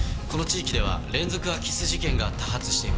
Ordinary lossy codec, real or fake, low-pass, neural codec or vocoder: none; real; none; none